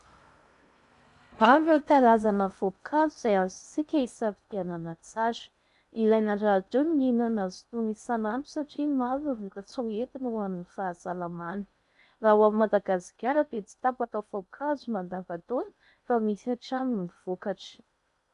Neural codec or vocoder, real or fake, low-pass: codec, 16 kHz in and 24 kHz out, 0.6 kbps, FocalCodec, streaming, 2048 codes; fake; 10.8 kHz